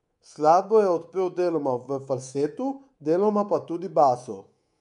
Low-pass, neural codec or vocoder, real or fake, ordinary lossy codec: 10.8 kHz; codec, 24 kHz, 3.1 kbps, DualCodec; fake; MP3, 64 kbps